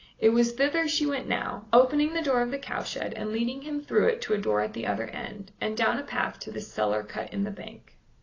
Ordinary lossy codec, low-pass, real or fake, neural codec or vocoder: AAC, 32 kbps; 7.2 kHz; real; none